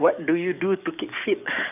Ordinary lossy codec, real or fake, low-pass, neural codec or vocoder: none; fake; 3.6 kHz; codec, 44.1 kHz, 7.8 kbps, DAC